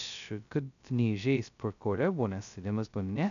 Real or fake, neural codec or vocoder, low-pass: fake; codec, 16 kHz, 0.2 kbps, FocalCodec; 7.2 kHz